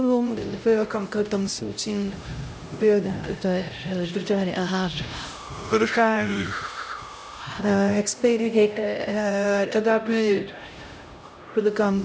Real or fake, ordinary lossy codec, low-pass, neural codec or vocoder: fake; none; none; codec, 16 kHz, 0.5 kbps, X-Codec, HuBERT features, trained on LibriSpeech